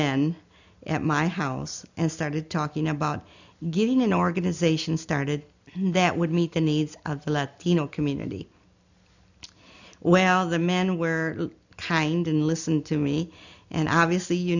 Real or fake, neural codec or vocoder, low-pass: real; none; 7.2 kHz